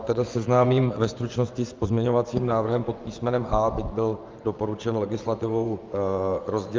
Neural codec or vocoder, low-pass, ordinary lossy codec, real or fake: vocoder, 24 kHz, 100 mel bands, Vocos; 7.2 kHz; Opus, 24 kbps; fake